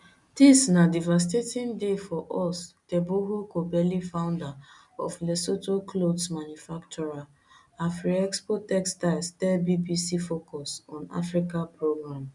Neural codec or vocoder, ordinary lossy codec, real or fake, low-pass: none; none; real; 10.8 kHz